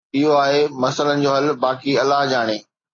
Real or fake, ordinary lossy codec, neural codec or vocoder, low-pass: real; AAC, 32 kbps; none; 7.2 kHz